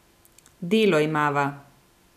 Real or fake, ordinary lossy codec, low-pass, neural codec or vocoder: real; none; 14.4 kHz; none